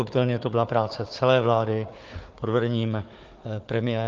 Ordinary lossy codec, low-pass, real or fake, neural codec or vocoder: Opus, 24 kbps; 7.2 kHz; fake; codec, 16 kHz, 4 kbps, FunCodec, trained on Chinese and English, 50 frames a second